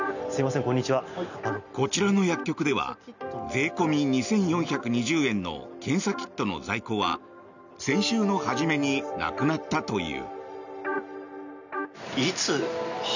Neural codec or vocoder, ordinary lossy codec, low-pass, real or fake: none; none; 7.2 kHz; real